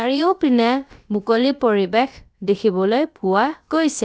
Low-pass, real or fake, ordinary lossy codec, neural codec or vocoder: none; fake; none; codec, 16 kHz, about 1 kbps, DyCAST, with the encoder's durations